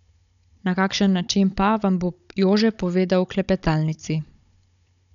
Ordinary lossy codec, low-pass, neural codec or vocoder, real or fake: none; 7.2 kHz; codec, 16 kHz, 16 kbps, FunCodec, trained on Chinese and English, 50 frames a second; fake